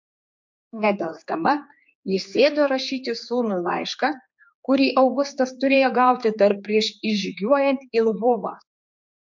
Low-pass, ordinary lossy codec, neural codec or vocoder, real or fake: 7.2 kHz; MP3, 48 kbps; codec, 16 kHz, 4 kbps, X-Codec, HuBERT features, trained on general audio; fake